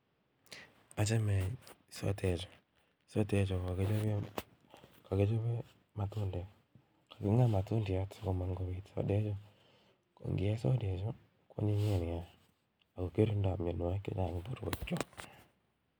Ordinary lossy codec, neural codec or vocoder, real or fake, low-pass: none; none; real; none